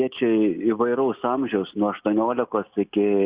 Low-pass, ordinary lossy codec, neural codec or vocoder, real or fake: 3.6 kHz; Opus, 64 kbps; none; real